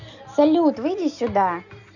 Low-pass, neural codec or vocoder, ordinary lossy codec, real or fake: 7.2 kHz; none; none; real